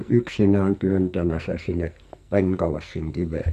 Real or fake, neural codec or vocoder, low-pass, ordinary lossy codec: fake; codec, 44.1 kHz, 2.6 kbps, SNAC; 14.4 kHz; none